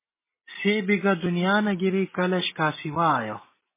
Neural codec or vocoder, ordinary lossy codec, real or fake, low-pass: none; MP3, 16 kbps; real; 3.6 kHz